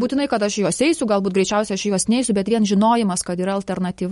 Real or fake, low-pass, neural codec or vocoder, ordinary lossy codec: real; 14.4 kHz; none; MP3, 48 kbps